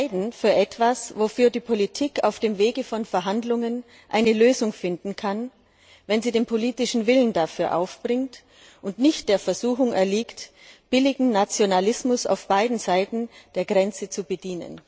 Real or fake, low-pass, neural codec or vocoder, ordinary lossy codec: real; none; none; none